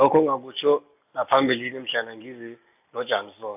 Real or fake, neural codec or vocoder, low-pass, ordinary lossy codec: real; none; 3.6 kHz; AAC, 32 kbps